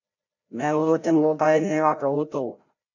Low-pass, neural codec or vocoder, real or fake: 7.2 kHz; codec, 16 kHz, 0.5 kbps, FreqCodec, larger model; fake